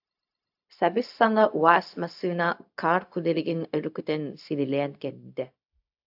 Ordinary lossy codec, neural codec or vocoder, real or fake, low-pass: AAC, 48 kbps; codec, 16 kHz, 0.4 kbps, LongCat-Audio-Codec; fake; 5.4 kHz